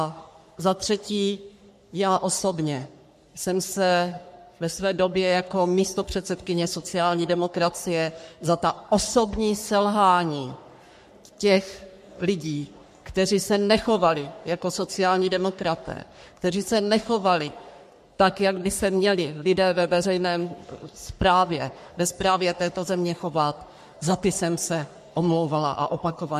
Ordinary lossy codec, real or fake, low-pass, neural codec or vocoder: MP3, 64 kbps; fake; 14.4 kHz; codec, 44.1 kHz, 3.4 kbps, Pupu-Codec